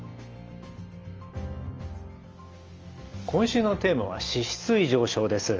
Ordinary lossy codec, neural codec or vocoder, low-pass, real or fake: Opus, 24 kbps; none; 7.2 kHz; real